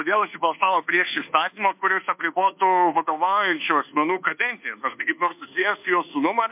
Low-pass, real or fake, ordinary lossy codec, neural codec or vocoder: 3.6 kHz; fake; MP3, 32 kbps; codec, 24 kHz, 1.2 kbps, DualCodec